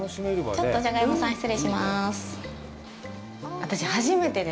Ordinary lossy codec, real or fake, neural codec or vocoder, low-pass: none; real; none; none